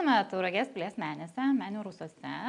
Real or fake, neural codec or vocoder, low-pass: real; none; 10.8 kHz